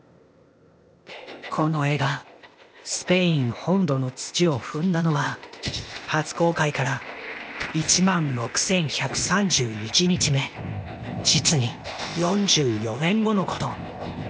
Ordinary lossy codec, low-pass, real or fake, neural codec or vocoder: none; none; fake; codec, 16 kHz, 0.8 kbps, ZipCodec